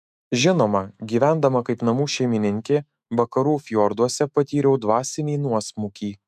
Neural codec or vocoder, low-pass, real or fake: autoencoder, 48 kHz, 128 numbers a frame, DAC-VAE, trained on Japanese speech; 14.4 kHz; fake